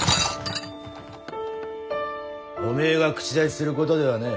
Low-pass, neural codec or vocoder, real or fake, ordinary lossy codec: none; none; real; none